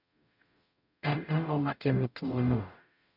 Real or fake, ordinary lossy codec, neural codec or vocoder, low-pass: fake; none; codec, 44.1 kHz, 0.9 kbps, DAC; 5.4 kHz